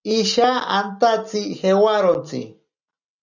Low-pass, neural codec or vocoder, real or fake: 7.2 kHz; none; real